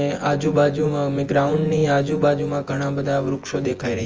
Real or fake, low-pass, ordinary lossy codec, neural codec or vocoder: fake; 7.2 kHz; Opus, 24 kbps; vocoder, 24 kHz, 100 mel bands, Vocos